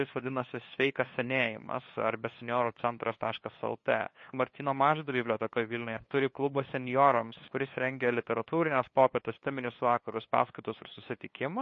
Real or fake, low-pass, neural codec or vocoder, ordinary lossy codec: fake; 7.2 kHz; codec, 16 kHz, 2 kbps, FunCodec, trained on LibriTTS, 25 frames a second; MP3, 32 kbps